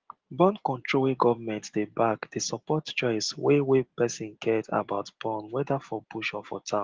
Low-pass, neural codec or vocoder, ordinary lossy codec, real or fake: 7.2 kHz; none; Opus, 16 kbps; real